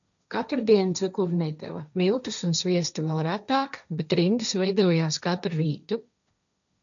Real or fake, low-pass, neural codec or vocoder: fake; 7.2 kHz; codec, 16 kHz, 1.1 kbps, Voila-Tokenizer